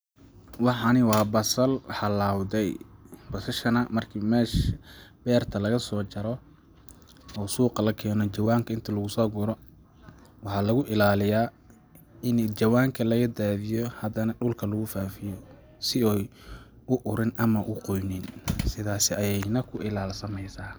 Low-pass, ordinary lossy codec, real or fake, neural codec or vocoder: none; none; real; none